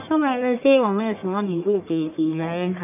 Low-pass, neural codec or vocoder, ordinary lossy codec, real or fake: 3.6 kHz; codec, 44.1 kHz, 1.7 kbps, Pupu-Codec; none; fake